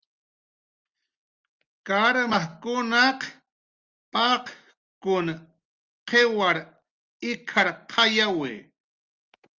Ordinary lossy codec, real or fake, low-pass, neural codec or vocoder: Opus, 32 kbps; real; 7.2 kHz; none